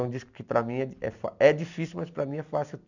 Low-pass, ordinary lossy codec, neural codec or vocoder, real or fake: 7.2 kHz; none; none; real